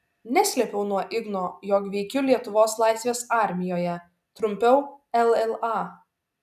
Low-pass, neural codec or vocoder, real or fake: 14.4 kHz; none; real